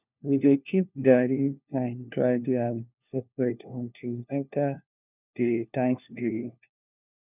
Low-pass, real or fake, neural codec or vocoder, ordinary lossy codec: 3.6 kHz; fake; codec, 16 kHz, 1 kbps, FunCodec, trained on LibriTTS, 50 frames a second; none